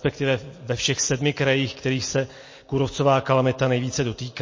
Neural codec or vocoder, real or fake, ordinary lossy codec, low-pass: none; real; MP3, 32 kbps; 7.2 kHz